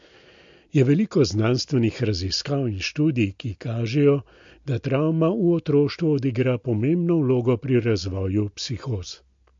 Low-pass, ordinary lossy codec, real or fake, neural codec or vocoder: 7.2 kHz; MP3, 48 kbps; real; none